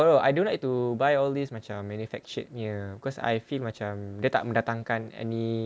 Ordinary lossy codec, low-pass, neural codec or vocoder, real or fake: none; none; none; real